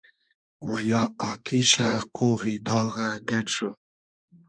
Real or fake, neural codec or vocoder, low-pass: fake; codec, 24 kHz, 1 kbps, SNAC; 9.9 kHz